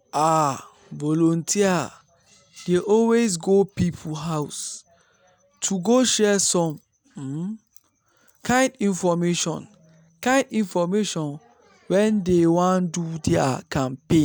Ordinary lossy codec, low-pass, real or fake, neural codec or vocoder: none; none; real; none